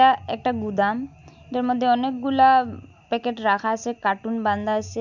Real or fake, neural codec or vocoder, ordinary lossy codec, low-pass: real; none; none; 7.2 kHz